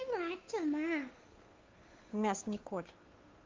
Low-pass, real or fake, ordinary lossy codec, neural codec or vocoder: 7.2 kHz; fake; Opus, 32 kbps; codec, 16 kHz, 2 kbps, FunCodec, trained on Chinese and English, 25 frames a second